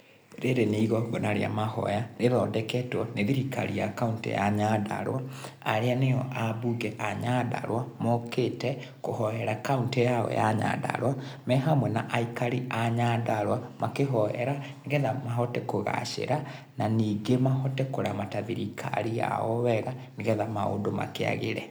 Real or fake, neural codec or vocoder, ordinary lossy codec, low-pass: real; none; none; none